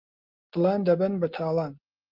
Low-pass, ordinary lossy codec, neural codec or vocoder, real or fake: 5.4 kHz; Opus, 24 kbps; none; real